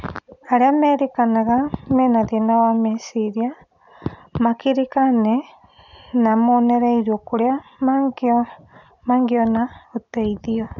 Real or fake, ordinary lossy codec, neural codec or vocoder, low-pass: real; none; none; 7.2 kHz